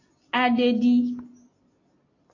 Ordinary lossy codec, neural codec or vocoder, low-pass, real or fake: AAC, 32 kbps; none; 7.2 kHz; real